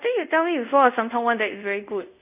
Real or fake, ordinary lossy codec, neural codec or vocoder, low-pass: fake; none; codec, 24 kHz, 0.5 kbps, DualCodec; 3.6 kHz